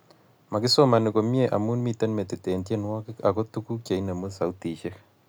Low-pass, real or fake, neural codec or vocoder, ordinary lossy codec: none; real; none; none